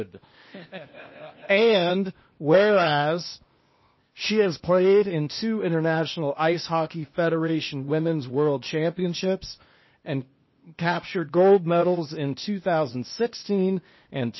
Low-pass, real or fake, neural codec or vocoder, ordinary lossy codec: 7.2 kHz; fake; codec, 16 kHz, 0.8 kbps, ZipCodec; MP3, 24 kbps